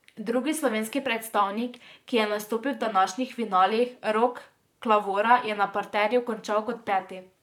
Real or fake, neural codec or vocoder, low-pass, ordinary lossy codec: fake; vocoder, 44.1 kHz, 128 mel bands, Pupu-Vocoder; 19.8 kHz; none